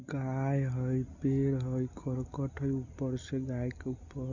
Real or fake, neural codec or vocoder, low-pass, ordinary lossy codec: real; none; 7.2 kHz; none